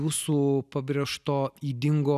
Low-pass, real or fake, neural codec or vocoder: 14.4 kHz; real; none